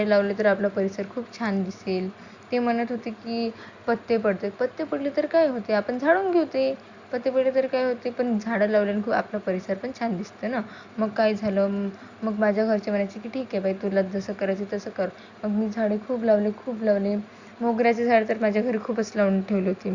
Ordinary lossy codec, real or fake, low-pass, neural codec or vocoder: Opus, 64 kbps; real; 7.2 kHz; none